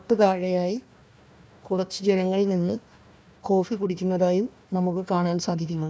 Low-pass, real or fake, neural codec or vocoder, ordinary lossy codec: none; fake; codec, 16 kHz, 1 kbps, FunCodec, trained on Chinese and English, 50 frames a second; none